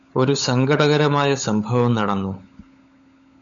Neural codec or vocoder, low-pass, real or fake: codec, 16 kHz, 16 kbps, FunCodec, trained on LibriTTS, 50 frames a second; 7.2 kHz; fake